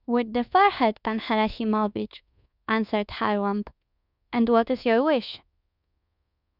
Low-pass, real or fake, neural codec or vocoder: 5.4 kHz; fake; codec, 24 kHz, 1.2 kbps, DualCodec